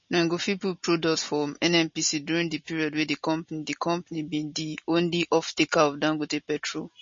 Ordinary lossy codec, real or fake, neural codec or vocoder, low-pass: MP3, 32 kbps; real; none; 7.2 kHz